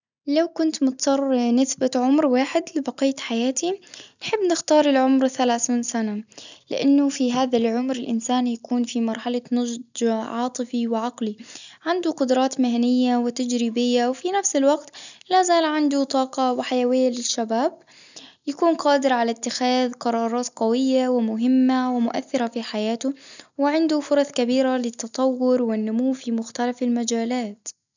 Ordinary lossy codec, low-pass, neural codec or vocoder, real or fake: none; 7.2 kHz; none; real